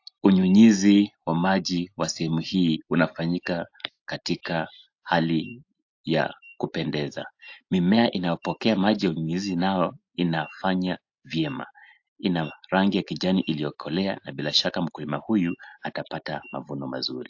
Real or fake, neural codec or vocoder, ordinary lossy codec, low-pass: real; none; AAC, 48 kbps; 7.2 kHz